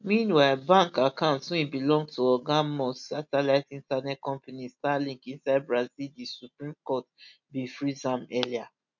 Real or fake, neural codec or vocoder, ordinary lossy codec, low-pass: real; none; none; 7.2 kHz